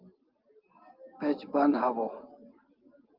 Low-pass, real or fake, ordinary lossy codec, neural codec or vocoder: 5.4 kHz; real; Opus, 24 kbps; none